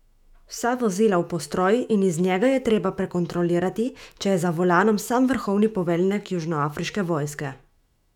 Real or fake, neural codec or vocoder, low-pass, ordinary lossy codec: fake; autoencoder, 48 kHz, 128 numbers a frame, DAC-VAE, trained on Japanese speech; 19.8 kHz; none